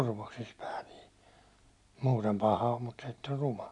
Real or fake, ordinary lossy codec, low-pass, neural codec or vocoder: real; none; 10.8 kHz; none